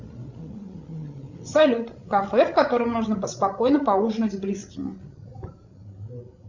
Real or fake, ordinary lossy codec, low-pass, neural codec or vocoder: fake; Opus, 64 kbps; 7.2 kHz; codec, 16 kHz, 16 kbps, FreqCodec, larger model